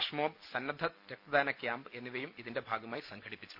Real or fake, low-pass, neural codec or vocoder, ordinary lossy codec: real; 5.4 kHz; none; Opus, 64 kbps